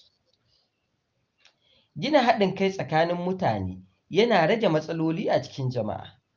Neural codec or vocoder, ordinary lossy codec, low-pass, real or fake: none; Opus, 24 kbps; 7.2 kHz; real